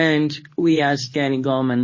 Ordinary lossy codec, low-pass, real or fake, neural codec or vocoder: MP3, 32 kbps; 7.2 kHz; fake; codec, 24 kHz, 0.9 kbps, WavTokenizer, medium speech release version 2